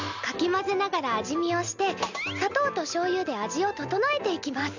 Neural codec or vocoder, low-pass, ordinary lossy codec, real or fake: none; 7.2 kHz; none; real